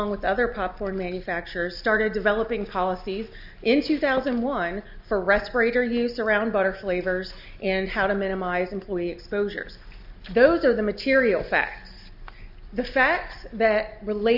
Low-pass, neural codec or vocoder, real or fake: 5.4 kHz; none; real